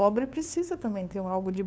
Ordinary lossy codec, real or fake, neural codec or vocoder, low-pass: none; fake; codec, 16 kHz, 2 kbps, FunCodec, trained on LibriTTS, 25 frames a second; none